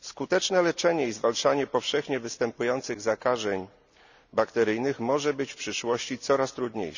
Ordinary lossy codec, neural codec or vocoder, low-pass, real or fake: none; none; 7.2 kHz; real